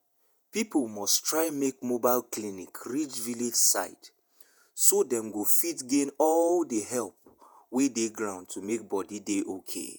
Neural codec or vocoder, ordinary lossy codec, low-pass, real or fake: vocoder, 48 kHz, 128 mel bands, Vocos; none; none; fake